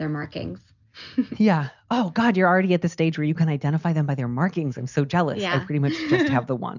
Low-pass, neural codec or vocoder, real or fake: 7.2 kHz; none; real